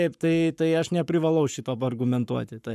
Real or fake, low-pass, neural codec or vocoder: fake; 14.4 kHz; codec, 44.1 kHz, 7.8 kbps, Pupu-Codec